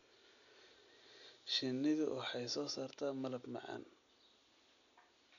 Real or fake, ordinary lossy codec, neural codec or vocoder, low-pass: real; none; none; 7.2 kHz